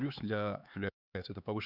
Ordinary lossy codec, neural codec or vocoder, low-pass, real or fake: none; codec, 16 kHz, 4 kbps, X-Codec, HuBERT features, trained on LibriSpeech; 5.4 kHz; fake